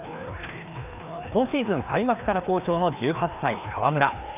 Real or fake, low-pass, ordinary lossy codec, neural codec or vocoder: fake; 3.6 kHz; none; codec, 16 kHz, 2 kbps, FreqCodec, larger model